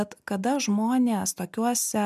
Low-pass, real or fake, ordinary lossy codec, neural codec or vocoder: 14.4 kHz; real; MP3, 96 kbps; none